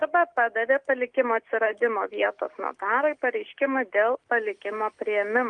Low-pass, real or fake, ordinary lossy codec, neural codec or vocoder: 9.9 kHz; real; Opus, 24 kbps; none